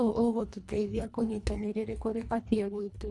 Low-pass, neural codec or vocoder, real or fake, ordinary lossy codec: none; codec, 24 kHz, 1.5 kbps, HILCodec; fake; none